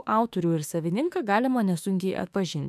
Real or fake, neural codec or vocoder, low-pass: fake; autoencoder, 48 kHz, 32 numbers a frame, DAC-VAE, trained on Japanese speech; 14.4 kHz